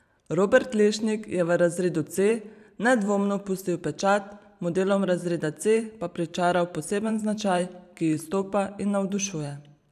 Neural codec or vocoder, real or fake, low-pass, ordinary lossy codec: vocoder, 44.1 kHz, 128 mel bands every 512 samples, BigVGAN v2; fake; 14.4 kHz; none